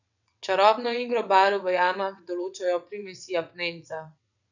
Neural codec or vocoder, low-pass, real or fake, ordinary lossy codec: vocoder, 22.05 kHz, 80 mel bands, WaveNeXt; 7.2 kHz; fake; none